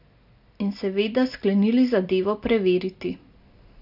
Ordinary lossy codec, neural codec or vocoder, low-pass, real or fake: none; none; 5.4 kHz; real